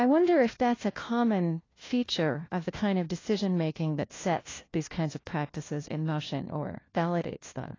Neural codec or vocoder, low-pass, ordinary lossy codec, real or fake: codec, 16 kHz, 1 kbps, FunCodec, trained on LibriTTS, 50 frames a second; 7.2 kHz; AAC, 32 kbps; fake